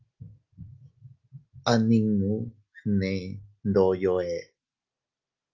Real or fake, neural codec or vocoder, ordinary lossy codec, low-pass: real; none; Opus, 32 kbps; 7.2 kHz